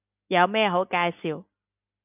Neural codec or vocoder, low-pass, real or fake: none; 3.6 kHz; real